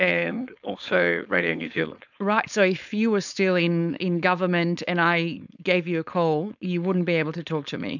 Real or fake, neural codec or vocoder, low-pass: fake; codec, 16 kHz, 4.8 kbps, FACodec; 7.2 kHz